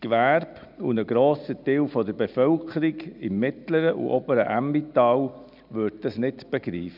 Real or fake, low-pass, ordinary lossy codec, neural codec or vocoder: real; 5.4 kHz; none; none